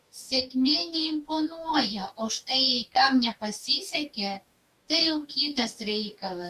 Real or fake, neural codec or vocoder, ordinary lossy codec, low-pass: fake; codec, 44.1 kHz, 2.6 kbps, DAC; Opus, 64 kbps; 14.4 kHz